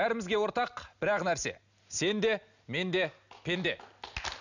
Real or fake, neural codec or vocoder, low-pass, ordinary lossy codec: real; none; 7.2 kHz; none